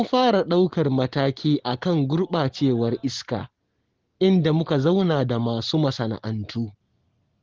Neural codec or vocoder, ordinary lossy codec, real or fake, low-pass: none; Opus, 16 kbps; real; 7.2 kHz